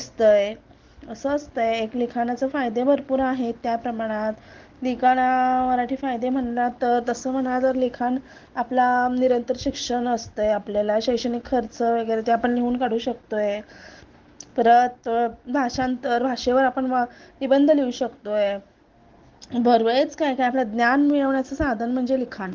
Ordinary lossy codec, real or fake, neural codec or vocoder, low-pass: Opus, 16 kbps; real; none; 7.2 kHz